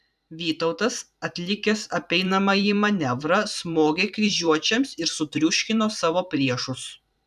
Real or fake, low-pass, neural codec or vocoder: fake; 14.4 kHz; vocoder, 44.1 kHz, 128 mel bands every 512 samples, BigVGAN v2